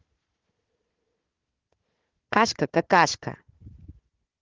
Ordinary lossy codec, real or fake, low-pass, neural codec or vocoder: Opus, 16 kbps; fake; 7.2 kHz; codec, 16 kHz, 4 kbps, FunCodec, trained on Chinese and English, 50 frames a second